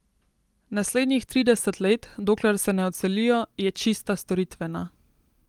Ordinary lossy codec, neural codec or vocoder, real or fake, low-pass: Opus, 24 kbps; none; real; 19.8 kHz